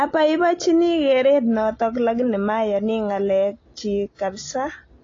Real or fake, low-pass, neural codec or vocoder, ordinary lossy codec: real; 7.2 kHz; none; AAC, 32 kbps